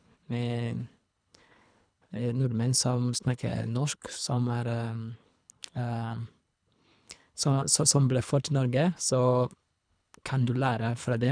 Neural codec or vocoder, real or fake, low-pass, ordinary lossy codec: codec, 24 kHz, 3 kbps, HILCodec; fake; 9.9 kHz; none